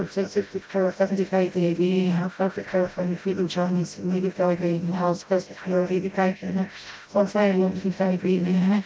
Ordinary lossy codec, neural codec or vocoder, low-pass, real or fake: none; codec, 16 kHz, 0.5 kbps, FreqCodec, smaller model; none; fake